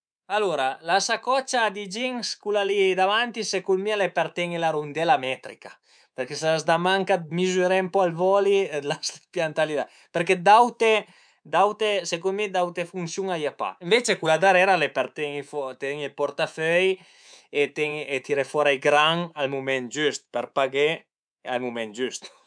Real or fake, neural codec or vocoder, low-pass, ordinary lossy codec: fake; codec, 24 kHz, 3.1 kbps, DualCodec; 9.9 kHz; none